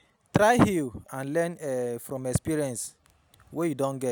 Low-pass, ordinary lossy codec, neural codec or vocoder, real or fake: none; none; none; real